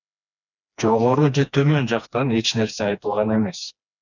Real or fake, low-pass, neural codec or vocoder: fake; 7.2 kHz; codec, 16 kHz, 2 kbps, FreqCodec, smaller model